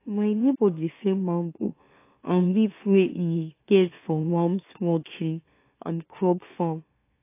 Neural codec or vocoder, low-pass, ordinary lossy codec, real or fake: autoencoder, 44.1 kHz, a latent of 192 numbers a frame, MeloTTS; 3.6 kHz; AAC, 24 kbps; fake